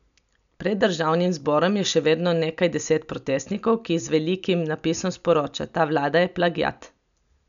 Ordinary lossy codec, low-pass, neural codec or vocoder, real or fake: none; 7.2 kHz; none; real